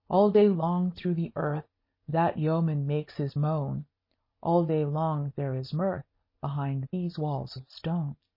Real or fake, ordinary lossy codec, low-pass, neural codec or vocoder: real; MP3, 24 kbps; 5.4 kHz; none